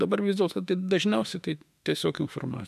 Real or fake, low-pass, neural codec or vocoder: fake; 14.4 kHz; autoencoder, 48 kHz, 32 numbers a frame, DAC-VAE, trained on Japanese speech